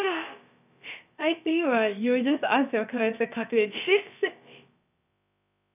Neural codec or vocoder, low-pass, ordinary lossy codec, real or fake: codec, 16 kHz, about 1 kbps, DyCAST, with the encoder's durations; 3.6 kHz; none; fake